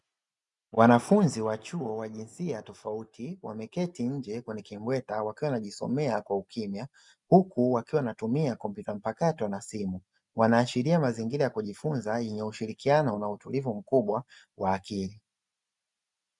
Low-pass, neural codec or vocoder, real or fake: 10.8 kHz; none; real